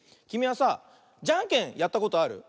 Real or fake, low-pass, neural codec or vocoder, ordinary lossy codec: real; none; none; none